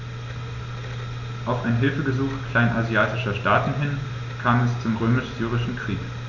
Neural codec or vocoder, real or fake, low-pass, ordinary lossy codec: none; real; 7.2 kHz; none